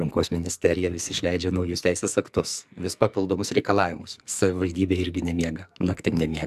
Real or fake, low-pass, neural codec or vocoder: fake; 14.4 kHz; codec, 44.1 kHz, 2.6 kbps, SNAC